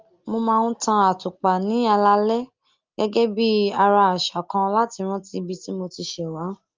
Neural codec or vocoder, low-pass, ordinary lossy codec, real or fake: none; 7.2 kHz; Opus, 32 kbps; real